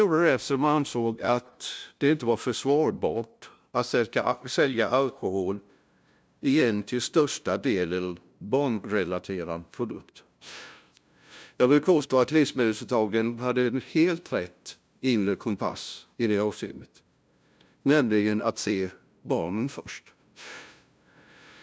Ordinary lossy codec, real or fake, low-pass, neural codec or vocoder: none; fake; none; codec, 16 kHz, 0.5 kbps, FunCodec, trained on LibriTTS, 25 frames a second